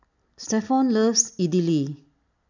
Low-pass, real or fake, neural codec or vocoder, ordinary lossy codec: 7.2 kHz; real; none; none